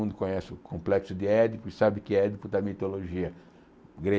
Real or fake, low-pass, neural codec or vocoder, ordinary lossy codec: real; none; none; none